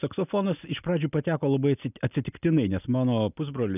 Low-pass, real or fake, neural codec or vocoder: 3.6 kHz; real; none